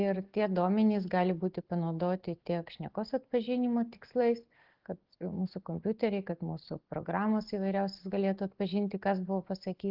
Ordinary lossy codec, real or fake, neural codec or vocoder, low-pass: Opus, 16 kbps; real; none; 5.4 kHz